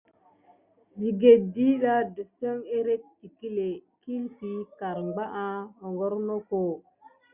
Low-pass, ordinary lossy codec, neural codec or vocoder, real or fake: 3.6 kHz; Opus, 64 kbps; none; real